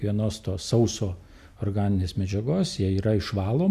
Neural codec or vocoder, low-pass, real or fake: none; 14.4 kHz; real